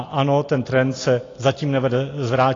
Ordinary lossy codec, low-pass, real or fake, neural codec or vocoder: AAC, 32 kbps; 7.2 kHz; real; none